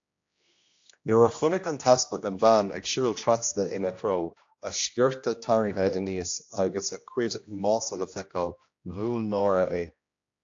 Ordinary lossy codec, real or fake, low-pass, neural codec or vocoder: AAC, 48 kbps; fake; 7.2 kHz; codec, 16 kHz, 1 kbps, X-Codec, HuBERT features, trained on general audio